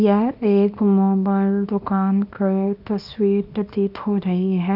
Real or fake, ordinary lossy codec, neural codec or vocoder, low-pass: fake; none; codec, 24 kHz, 0.9 kbps, WavTokenizer, small release; 5.4 kHz